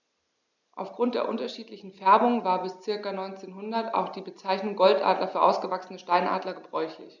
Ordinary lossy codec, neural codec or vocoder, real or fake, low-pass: none; none; real; 7.2 kHz